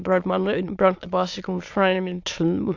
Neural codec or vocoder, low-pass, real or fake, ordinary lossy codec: autoencoder, 22.05 kHz, a latent of 192 numbers a frame, VITS, trained on many speakers; 7.2 kHz; fake; AAC, 48 kbps